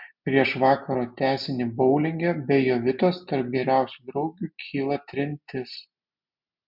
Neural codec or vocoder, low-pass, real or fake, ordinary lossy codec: none; 5.4 kHz; real; MP3, 48 kbps